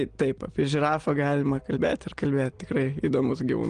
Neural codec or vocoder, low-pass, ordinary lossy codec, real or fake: none; 9.9 kHz; Opus, 24 kbps; real